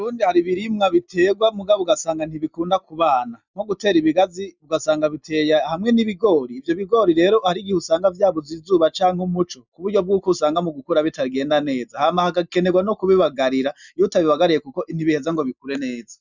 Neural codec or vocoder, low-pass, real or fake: none; 7.2 kHz; real